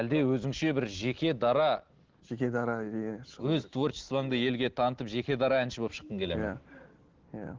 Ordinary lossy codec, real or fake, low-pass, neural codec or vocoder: Opus, 16 kbps; real; 7.2 kHz; none